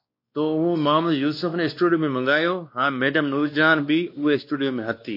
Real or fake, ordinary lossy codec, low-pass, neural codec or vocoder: fake; MP3, 32 kbps; 5.4 kHz; codec, 16 kHz, 2 kbps, X-Codec, WavLM features, trained on Multilingual LibriSpeech